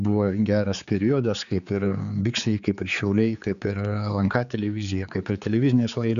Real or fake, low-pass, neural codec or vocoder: fake; 7.2 kHz; codec, 16 kHz, 4 kbps, X-Codec, HuBERT features, trained on general audio